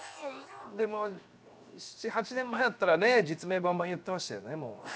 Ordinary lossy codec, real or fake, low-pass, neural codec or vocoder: none; fake; none; codec, 16 kHz, 0.7 kbps, FocalCodec